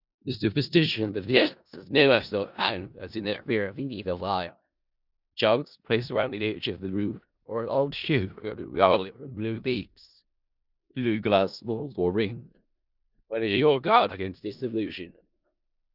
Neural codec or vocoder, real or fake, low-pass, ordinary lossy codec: codec, 16 kHz in and 24 kHz out, 0.4 kbps, LongCat-Audio-Codec, four codebook decoder; fake; 5.4 kHz; Opus, 64 kbps